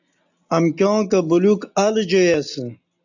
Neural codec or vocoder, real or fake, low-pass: none; real; 7.2 kHz